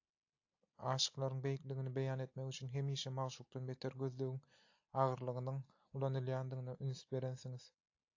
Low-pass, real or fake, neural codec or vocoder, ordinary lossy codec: 7.2 kHz; real; none; AAC, 48 kbps